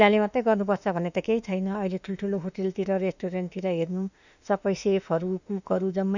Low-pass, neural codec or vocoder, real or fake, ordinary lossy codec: 7.2 kHz; autoencoder, 48 kHz, 32 numbers a frame, DAC-VAE, trained on Japanese speech; fake; none